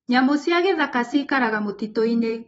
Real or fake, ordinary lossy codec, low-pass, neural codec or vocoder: fake; AAC, 24 kbps; 19.8 kHz; vocoder, 44.1 kHz, 128 mel bands every 512 samples, BigVGAN v2